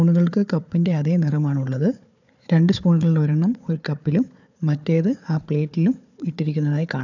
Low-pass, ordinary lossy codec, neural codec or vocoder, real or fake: 7.2 kHz; none; codec, 16 kHz, 4 kbps, FunCodec, trained on Chinese and English, 50 frames a second; fake